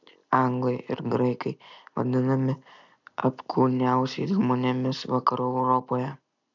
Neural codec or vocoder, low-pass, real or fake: none; 7.2 kHz; real